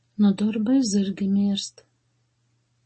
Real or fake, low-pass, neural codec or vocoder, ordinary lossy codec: real; 10.8 kHz; none; MP3, 32 kbps